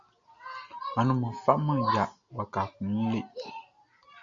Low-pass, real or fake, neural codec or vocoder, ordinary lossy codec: 7.2 kHz; real; none; MP3, 64 kbps